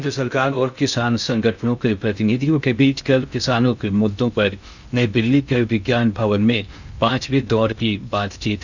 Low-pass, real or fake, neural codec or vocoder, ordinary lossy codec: 7.2 kHz; fake; codec, 16 kHz in and 24 kHz out, 0.6 kbps, FocalCodec, streaming, 2048 codes; none